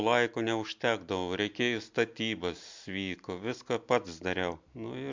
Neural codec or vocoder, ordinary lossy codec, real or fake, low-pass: none; MP3, 64 kbps; real; 7.2 kHz